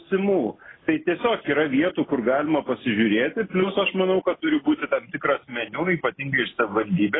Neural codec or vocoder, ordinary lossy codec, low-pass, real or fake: none; AAC, 16 kbps; 7.2 kHz; real